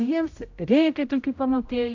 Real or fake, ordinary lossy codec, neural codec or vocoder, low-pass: fake; AAC, 48 kbps; codec, 16 kHz, 0.5 kbps, X-Codec, HuBERT features, trained on general audio; 7.2 kHz